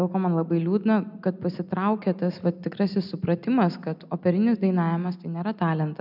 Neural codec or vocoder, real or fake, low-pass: none; real; 5.4 kHz